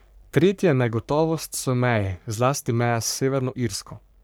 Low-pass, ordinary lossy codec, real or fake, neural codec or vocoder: none; none; fake; codec, 44.1 kHz, 3.4 kbps, Pupu-Codec